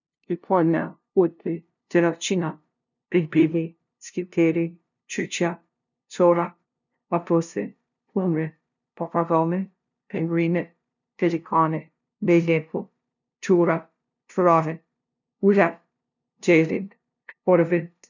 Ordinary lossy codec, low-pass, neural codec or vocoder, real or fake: none; 7.2 kHz; codec, 16 kHz, 0.5 kbps, FunCodec, trained on LibriTTS, 25 frames a second; fake